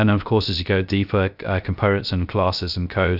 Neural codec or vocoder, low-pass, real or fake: codec, 16 kHz, 0.3 kbps, FocalCodec; 5.4 kHz; fake